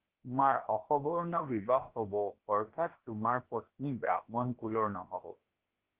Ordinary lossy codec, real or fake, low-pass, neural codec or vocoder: Opus, 16 kbps; fake; 3.6 kHz; codec, 16 kHz, about 1 kbps, DyCAST, with the encoder's durations